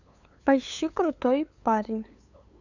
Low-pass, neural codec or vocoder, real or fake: 7.2 kHz; codec, 16 kHz, 8 kbps, FunCodec, trained on LibriTTS, 25 frames a second; fake